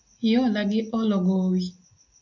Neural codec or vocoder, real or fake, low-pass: none; real; 7.2 kHz